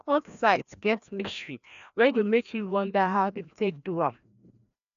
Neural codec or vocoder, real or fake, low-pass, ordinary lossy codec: codec, 16 kHz, 1 kbps, FreqCodec, larger model; fake; 7.2 kHz; none